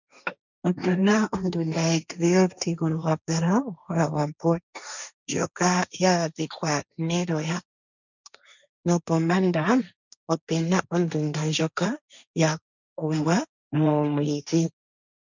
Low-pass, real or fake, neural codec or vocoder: 7.2 kHz; fake; codec, 16 kHz, 1.1 kbps, Voila-Tokenizer